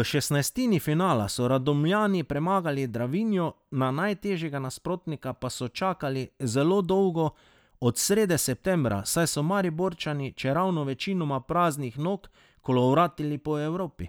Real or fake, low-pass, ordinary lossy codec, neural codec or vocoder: real; none; none; none